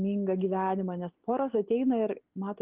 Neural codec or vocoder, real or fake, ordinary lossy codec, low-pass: none; real; Opus, 24 kbps; 3.6 kHz